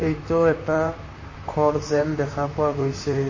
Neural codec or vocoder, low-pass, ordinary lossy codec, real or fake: vocoder, 44.1 kHz, 128 mel bands, Pupu-Vocoder; 7.2 kHz; MP3, 32 kbps; fake